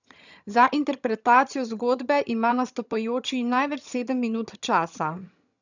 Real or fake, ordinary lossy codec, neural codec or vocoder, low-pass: fake; none; vocoder, 22.05 kHz, 80 mel bands, HiFi-GAN; 7.2 kHz